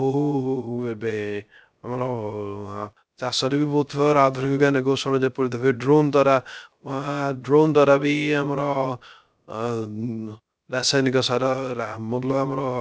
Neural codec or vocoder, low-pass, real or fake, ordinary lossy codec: codec, 16 kHz, 0.3 kbps, FocalCodec; none; fake; none